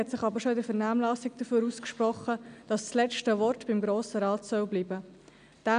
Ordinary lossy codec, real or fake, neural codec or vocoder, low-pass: none; real; none; 9.9 kHz